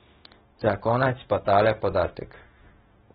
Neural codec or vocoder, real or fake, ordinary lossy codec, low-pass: codec, 16 kHz, 0.4 kbps, LongCat-Audio-Codec; fake; AAC, 16 kbps; 7.2 kHz